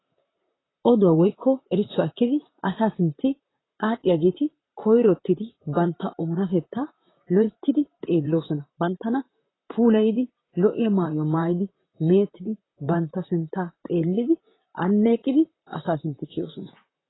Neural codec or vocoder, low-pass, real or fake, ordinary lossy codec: vocoder, 44.1 kHz, 128 mel bands, Pupu-Vocoder; 7.2 kHz; fake; AAC, 16 kbps